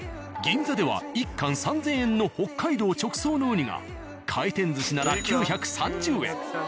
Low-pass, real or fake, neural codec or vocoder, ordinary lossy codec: none; real; none; none